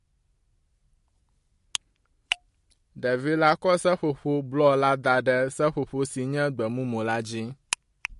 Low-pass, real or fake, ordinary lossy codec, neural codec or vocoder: 14.4 kHz; real; MP3, 48 kbps; none